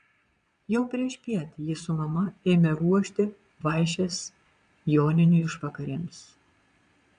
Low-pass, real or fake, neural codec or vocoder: 9.9 kHz; fake; vocoder, 22.05 kHz, 80 mel bands, Vocos